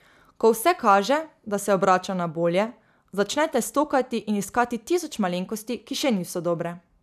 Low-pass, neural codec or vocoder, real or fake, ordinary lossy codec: 14.4 kHz; none; real; none